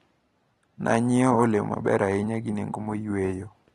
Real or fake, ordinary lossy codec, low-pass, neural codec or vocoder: real; AAC, 32 kbps; 19.8 kHz; none